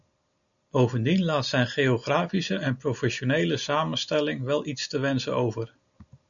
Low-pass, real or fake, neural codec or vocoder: 7.2 kHz; real; none